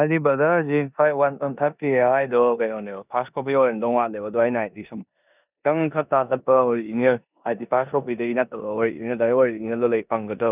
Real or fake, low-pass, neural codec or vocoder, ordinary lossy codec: fake; 3.6 kHz; codec, 16 kHz in and 24 kHz out, 0.9 kbps, LongCat-Audio-Codec, four codebook decoder; none